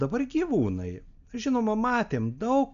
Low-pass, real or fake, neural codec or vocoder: 7.2 kHz; real; none